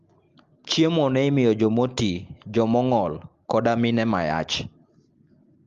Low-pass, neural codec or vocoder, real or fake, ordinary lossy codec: 7.2 kHz; none; real; Opus, 32 kbps